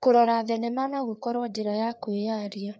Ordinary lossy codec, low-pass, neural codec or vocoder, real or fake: none; none; codec, 16 kHz, 4 kbps, FreqCodec, larger model; fake